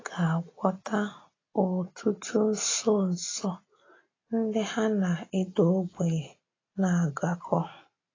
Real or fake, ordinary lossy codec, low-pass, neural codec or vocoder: real; AAC, 32 kbps; 7.2 kHz; none